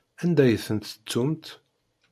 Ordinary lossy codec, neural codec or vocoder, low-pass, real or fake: MP3, 96 kbps; none; 14.4 kHz; real